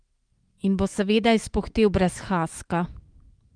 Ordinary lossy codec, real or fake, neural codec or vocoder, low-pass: Opus, 24 kbps; fake; autoencoder, 48 kHz, 128 numbers a frame, DAC-VAE, trained on Japanese speech; 9.9 kHz